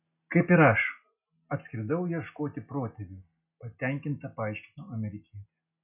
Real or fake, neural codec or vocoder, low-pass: real; none; 3.6 kHz